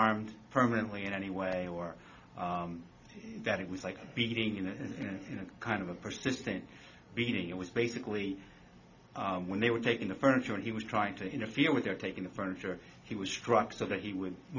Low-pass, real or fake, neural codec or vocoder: 7.2 kHz; real; none